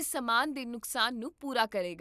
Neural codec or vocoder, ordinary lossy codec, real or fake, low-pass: none; none; real; 14.4 kHz